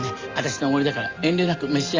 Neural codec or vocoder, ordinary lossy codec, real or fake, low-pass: none; Opus, 32 kbps; real; 7.2 kHz